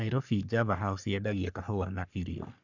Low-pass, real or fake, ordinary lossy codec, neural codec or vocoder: 7.2 kHz; fake; none; codec, 44.1 kHz, 3.4 kbps, Pupu-Codec